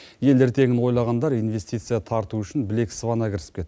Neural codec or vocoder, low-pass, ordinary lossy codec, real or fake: none; none; none; real